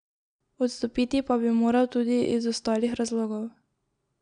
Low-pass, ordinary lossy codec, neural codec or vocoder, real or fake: 9.9 kHz; none; none; real